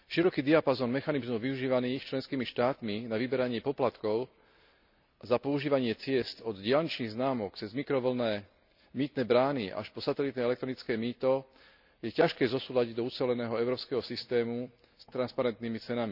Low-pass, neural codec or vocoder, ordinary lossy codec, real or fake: 5.4 kHz; none; none; real